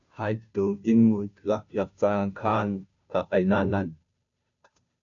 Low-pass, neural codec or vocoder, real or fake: 7.2 kHz; codec, 16 kHz, 0.5 kbps, FunCodec, trained on Chinese and English, 25 frames a second; fake